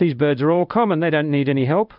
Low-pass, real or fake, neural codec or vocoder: 5.4 kHz; fake; codec, 16 kHz in and 24 kHz out, 1 kbps, XY-Tokenizer